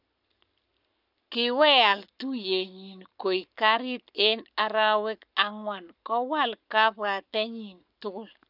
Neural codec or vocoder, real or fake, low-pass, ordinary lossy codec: codec, 44.1 kHz, 7.8 kbps, Pupu-Codec; fake; 5.4 kHz; none